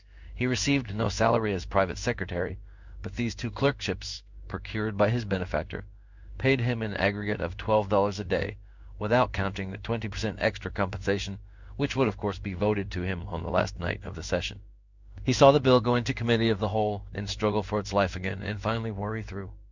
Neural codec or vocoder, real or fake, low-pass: codec, 16 kHz in and 24 kHz out, 1 kbps, XY-Tokenizer; fake; 7.2 kHz